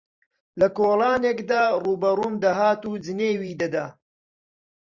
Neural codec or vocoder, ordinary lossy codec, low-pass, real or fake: vocoder, 44.1 kHz, 128 mel bands every 512 samples, BigVGAN v2; Opus, 64 kbps; 7.2 kHz; fake